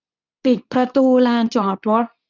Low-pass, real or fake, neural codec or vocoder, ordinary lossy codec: 7.2 kHz; fake; codec, 24 kHz, 0.9 kbps, WavTokenizer, medium speech release version 2; none